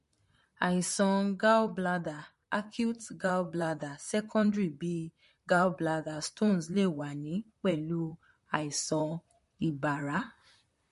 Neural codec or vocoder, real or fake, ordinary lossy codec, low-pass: vocoder, 44.1 kHz, 128 mel bands, Pupu-Vocoder; fake; MP3, 48 kbps; 14.4 kHz